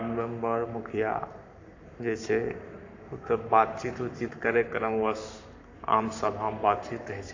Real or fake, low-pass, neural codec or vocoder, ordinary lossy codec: fake; 7.2 kHz; codec, 44.1 kHz, 7.8 kbps, Pupu-Codec; AAC, 48 kbps